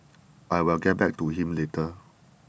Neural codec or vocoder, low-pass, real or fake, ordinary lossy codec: none; none; real; none